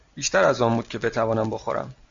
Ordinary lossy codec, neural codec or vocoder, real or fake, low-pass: MP3, 48 kbps; none; real; 7.2 kHz